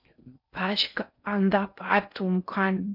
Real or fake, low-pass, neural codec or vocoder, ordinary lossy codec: fake; 5.4 kHz; codec, 16 kHz in and 24 kHz out, 0.6 kbps, FocalCodec, streaming, 4096 codes; MP3, 48 kbps